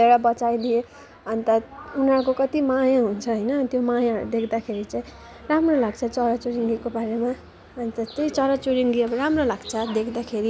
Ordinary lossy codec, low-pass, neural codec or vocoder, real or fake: none; none; none; real